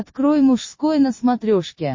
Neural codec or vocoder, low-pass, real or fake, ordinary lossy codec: none; 7.2 kHz; real; MP3, 32 kbps